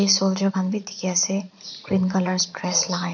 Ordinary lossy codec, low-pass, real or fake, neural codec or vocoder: none; 7.2 kHz; fake; vocoder, 44.1 kHz, 80 mel bands, Vocos